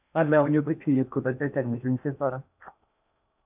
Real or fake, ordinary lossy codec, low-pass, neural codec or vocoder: fake; none; 3.6 kHz; codec, 16 kHz in and 24 kHz out, 0.8 kbps, FocalCodec, streaming, 65536 codes